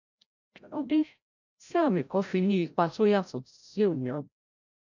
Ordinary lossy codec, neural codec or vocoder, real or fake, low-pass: none; codec, 16 kHz, 0.5 kbps, FreqCodec, larger model; fake; 7.2 kHz